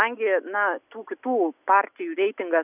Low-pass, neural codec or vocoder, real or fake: 3.6 kHz; none; real